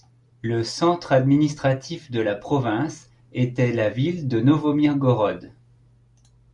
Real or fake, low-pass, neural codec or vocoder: real; 10.8 kHz; none